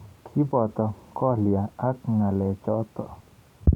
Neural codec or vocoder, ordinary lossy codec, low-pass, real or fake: vocoder, 44.1 kHz, 128 mel bands every 512 samples, BigVGAN v2; none; 19.8 kHz; fake